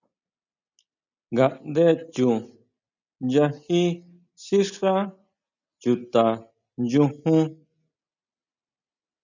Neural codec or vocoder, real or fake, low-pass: none; real; 7.2 kHz